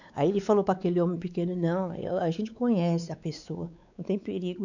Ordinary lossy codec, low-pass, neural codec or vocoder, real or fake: none; 7.2 kHz; codec, 16 kHz, 4 kbps, X-Codec, WavLM features, trained on Multilingual LibriSpeech; fake